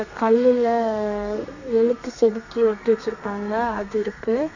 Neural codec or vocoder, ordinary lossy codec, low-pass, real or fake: codec, 32 kHz, 1.9 kbps, SNAC; none; 7.2 kHz; fake